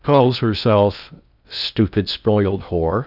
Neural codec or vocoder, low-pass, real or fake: codec, 16 kHz in and 24 kHz out, 0.6 kbps, FocalCodec, streaming, 2048 codes; 5.4 kHz; fake